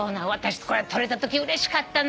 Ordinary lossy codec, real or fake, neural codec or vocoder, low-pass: none; real; none; none